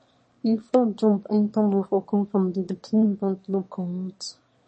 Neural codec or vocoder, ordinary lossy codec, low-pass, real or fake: autoencoder, 22.05 kHz, a latent of 192 numbers a frame, VITS, trained on one speaker; MP3, 32 kbps; 9.9 kHz; fake